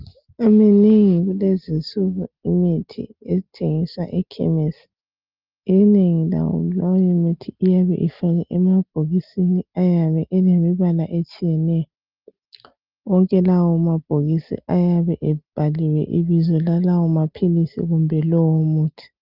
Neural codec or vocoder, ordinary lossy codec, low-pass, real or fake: none; Opus, 32 kbps; 5.4 kHz; real